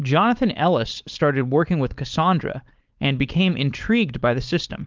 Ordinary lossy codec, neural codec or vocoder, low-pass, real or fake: Opus, 32 kbps; codec, 16 kHz, 4.8 kbps, FACodec; 7.2 kHz; fake